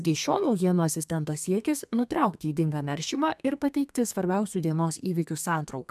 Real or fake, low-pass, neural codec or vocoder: fake; 14.4 kHz; codec, 32 kHz, 1.9 kbps, SNAC